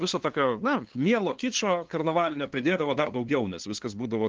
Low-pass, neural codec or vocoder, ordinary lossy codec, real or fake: 7.2 kHz; codec, 16 kHz, 2 kbps, FunCodec, trained on LibriTTS, 25 frames a second; Opus, 16 kbps; fake